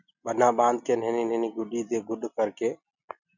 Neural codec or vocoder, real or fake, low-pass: none; real; 7.2 kHz